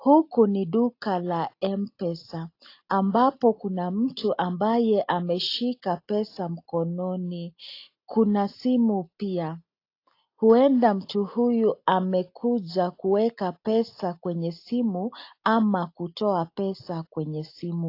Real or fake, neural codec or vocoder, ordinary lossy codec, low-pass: real; none; AAC, 32 kbps; 5.4 kHz